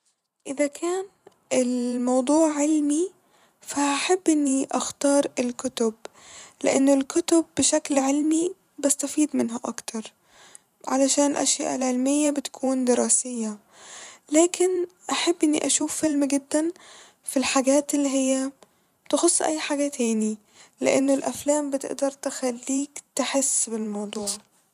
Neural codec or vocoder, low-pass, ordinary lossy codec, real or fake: vocoder, 44.1 kHz, 128 mel bands every 512 samples, BigVGAN v2; 14.4 kHz; none; fake